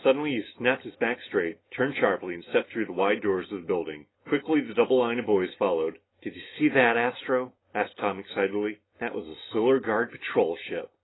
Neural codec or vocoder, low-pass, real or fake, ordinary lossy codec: none; 7.2 kHz; real; AAC, 16 kbps